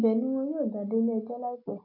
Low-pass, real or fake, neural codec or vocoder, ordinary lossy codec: 5.4 kHz; real; none; AAC, 48 kbps